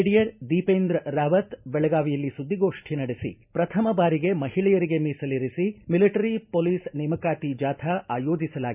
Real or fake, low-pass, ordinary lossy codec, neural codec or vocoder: real; 3.6 kHz; none; none